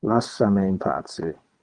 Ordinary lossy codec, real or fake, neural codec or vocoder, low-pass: Opus, 24 kbps; fake; vocoder, 22.05 kHz, 80 mel bands, WaveNeXt; 9.9 kHz